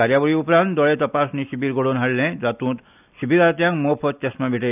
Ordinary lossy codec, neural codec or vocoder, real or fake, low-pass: none; none; real; 3.6 kHz